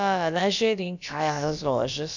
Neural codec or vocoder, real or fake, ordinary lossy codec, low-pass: codec, 16 kHz, about 1 kbps, DyCAST, with the encoder's durations; fake; none; 7.2 kHz